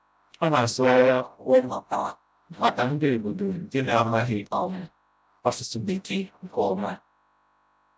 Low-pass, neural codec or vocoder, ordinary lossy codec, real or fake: none; codec, 16 kHz, 0.5 kbps, FreqCodec, smaller model; none; fake